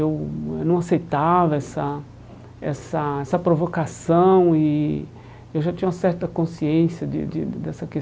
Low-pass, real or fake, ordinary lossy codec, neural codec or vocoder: none; real; none; none